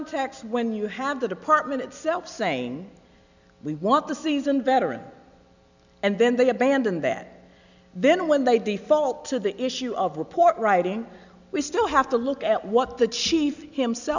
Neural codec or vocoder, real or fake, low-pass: none; real; 7.2 kHz